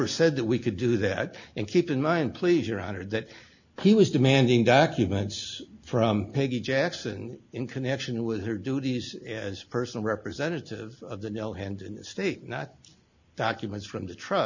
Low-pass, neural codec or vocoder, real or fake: 7.2 kHz; none; real